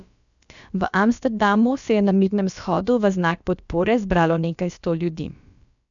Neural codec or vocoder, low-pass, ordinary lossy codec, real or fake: codec, 16 kHz, about 1 kbps, DyCAST, with the encoder's durations; 7.2 kHz; none; fake